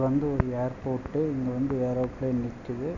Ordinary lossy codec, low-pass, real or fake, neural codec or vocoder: none; 7.2 kHz; real; none